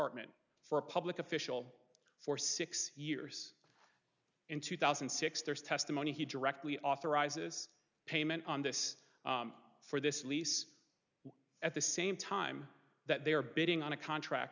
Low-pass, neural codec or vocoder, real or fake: 7.2 kHz; none; real